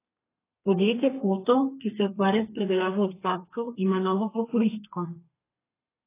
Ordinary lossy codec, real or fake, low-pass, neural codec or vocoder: AAC, 24 kbps; fake; 3.6 kHz; codec, 32 kHz, 1.9 kbps, SNAC